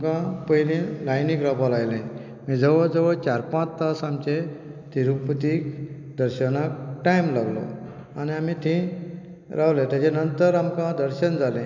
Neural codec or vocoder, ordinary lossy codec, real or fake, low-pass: none; MP3, 64 kbps; real; 7.2 kHz